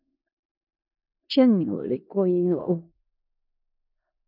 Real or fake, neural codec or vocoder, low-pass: fake; codec, 16 kHz in and 24 kHz out, 0.4 kbps, LongCat-Audio-Codec, four codebook decoder; 5.4 kHz